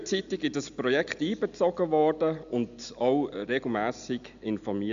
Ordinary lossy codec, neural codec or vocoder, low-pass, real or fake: AAC, 96 kbps; none; 7.2 kHz; real